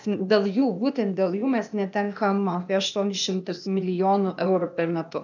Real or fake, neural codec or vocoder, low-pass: fake; codec, 16 kHz, 0.8 kbps, ZipCodec; 7.2 kHz